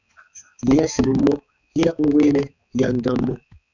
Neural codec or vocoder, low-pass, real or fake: codec, 16 kHz, 4 kbps, X-Codec, HuBERT features, trained on balanced general audio; 7.2 kHz; fake